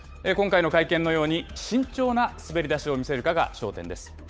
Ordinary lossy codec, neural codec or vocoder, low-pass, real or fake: none; codec, 16 kHz, 8 kbps, FunCodec, trained on Chinese and English, 25 frames a second; none; fake